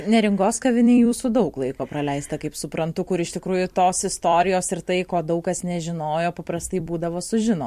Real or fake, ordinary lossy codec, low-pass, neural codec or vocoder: fake; MP3, 64 kbps; 14.4 kHz; vocoder, 44.1 kHz, 128 mel bands every 256 samples, BigVGAN v2